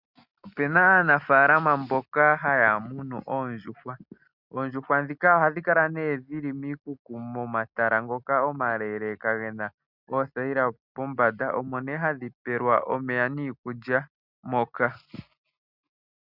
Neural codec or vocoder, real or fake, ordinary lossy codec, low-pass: none; real; Opus, 64 kbps; 5.4 kHz